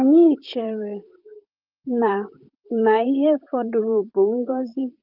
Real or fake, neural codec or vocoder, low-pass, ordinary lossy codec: fake; vocoder, 44.1 kHz, 128 mel bands, Pupu-Vocoder; 5.4 kHz; Opus, 24 kbps